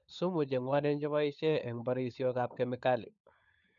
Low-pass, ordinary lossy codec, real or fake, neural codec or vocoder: 7.2 kHz; none; fake; codec, 16 kHz, 16 kbps, FunCodec, trained on LibriTTS, 50 frames a second